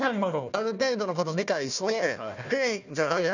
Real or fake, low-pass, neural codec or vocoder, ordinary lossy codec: fake; 7.2 kHz; codec, 16 kHz, 1 kbps, FunCodec, trained on Chinese and English, 50 frames a second; none